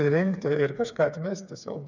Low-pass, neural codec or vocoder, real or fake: 7.2 kHz; codec, 16 kHz, 4 kbps, FreqCodec, smaller model; fake